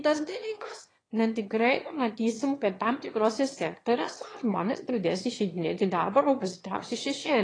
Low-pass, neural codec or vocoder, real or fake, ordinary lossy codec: 9.9 kHz; autoencoder, 22.05 kHz, a latent of 192 numbers a frame, VITS, trained on one speaker; fake; AAC, 32 kbps